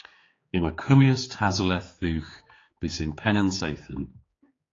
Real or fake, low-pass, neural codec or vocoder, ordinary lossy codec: fake; 7.2 kHz; codec, 16 kHz, 4 kbps, X-Codec, HuBERT features, trained on general audio; AAC, 32 kbps